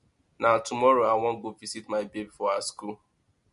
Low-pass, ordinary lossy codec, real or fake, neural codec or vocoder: 10.8 kHz; MP3, 48 kbps; real; none